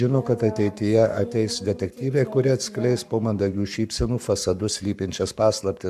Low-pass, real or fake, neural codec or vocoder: 14.4 kHz; fake; codec, 44.1 kHz, 7.8 kbps, Pupu-Codec